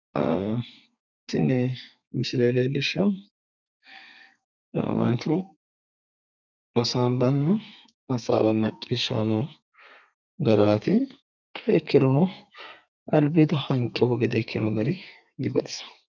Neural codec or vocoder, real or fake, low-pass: codec, 32 kHz, 1.9 kbps, SNAC; fake; 7.2 kHz